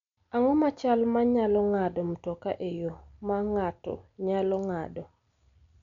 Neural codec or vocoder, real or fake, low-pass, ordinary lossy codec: none; real; 7.2 kHz; MP3, 96 kbps